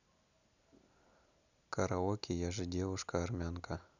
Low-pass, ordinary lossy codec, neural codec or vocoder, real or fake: 7.2 kHz; none; none; real